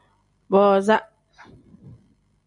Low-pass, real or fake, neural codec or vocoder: 10.8 kHz; real; none